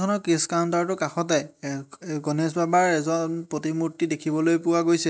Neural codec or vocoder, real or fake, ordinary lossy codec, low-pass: none; real; none; none